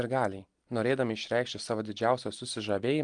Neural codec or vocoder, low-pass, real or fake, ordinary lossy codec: none; 9.9 kHz; real; Opus, 24 kbps